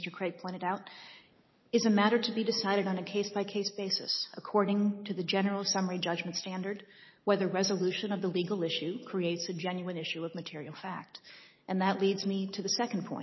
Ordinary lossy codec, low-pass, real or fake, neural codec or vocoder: MP3, 24 kbps; 7.2 kHz; real; none